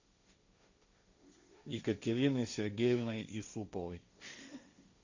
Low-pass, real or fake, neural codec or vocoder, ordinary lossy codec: 7.2 kHz; fake; codec, 16 kHz, 1.1 kbps, Voila-Tokenizer; Opus, 64 kbps